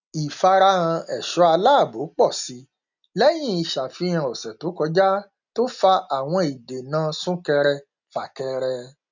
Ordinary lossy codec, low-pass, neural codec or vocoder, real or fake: none; 7.2 kHz; none; real